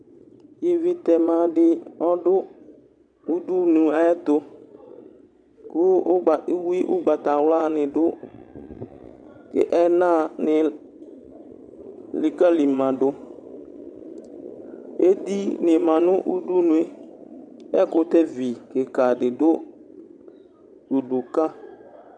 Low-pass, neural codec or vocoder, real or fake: 9.9 kHz; vocoder, 22.05 kHz, 80 mel bands, Vocos; fake